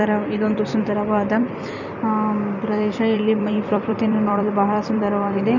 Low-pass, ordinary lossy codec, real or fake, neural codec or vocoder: 7.2 kHz; none; fake; vocoder, 44.1 kHz, 128 mel bands every 256 samples, BigVGAN v2